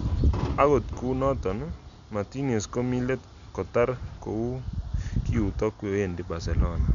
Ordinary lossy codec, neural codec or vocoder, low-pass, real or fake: none; none; 7.2 kHz; real